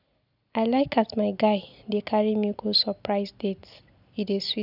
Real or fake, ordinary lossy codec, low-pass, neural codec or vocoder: real; none; 5.4 kHz; none